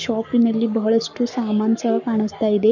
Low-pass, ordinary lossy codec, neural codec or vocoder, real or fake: 7.2 kHz; none; codec, 44.1 kHz, 7.8 kbps, Pupu-Codec; fake